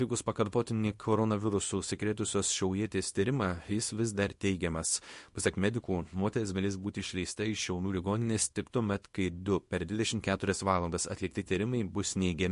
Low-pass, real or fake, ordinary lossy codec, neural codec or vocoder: 10.8 kHz; fake; MP3, 48 kbps; codec, 24 kHz, 0.9 kbps, WavTokenizer, small release